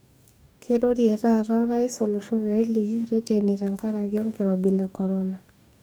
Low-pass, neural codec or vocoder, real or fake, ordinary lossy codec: none; codec, 44.1 kHz, 2.6 kbps, DAC; fake; none